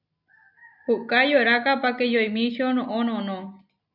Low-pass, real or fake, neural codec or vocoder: 5.4 kHz; real; none